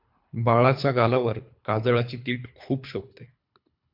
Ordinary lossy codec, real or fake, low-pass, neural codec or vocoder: MP3, 48 kbps; fake; 5.4 kHz; codec, 24 kHz, 3 kbps, HILCodec